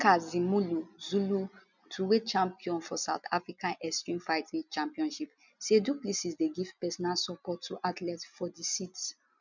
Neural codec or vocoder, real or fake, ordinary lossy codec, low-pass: none; real; none; 7.2 kHz